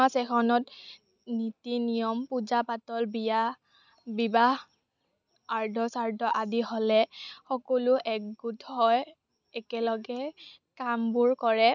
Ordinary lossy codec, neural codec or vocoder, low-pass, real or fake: none; none; 7.2 kHz; real